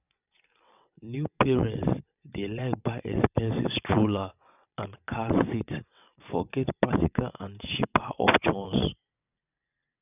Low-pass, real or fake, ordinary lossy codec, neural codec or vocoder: 3.6 kHz; fake; none; vocoder, 22.05 kHz, 80 mel bands, WaveNeXt